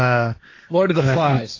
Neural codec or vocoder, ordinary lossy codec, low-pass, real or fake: codec, 16 kHz, 1.1 kbps, Voila-Tokenizer; AAC, 32 kbps; 7.2 kHz; fake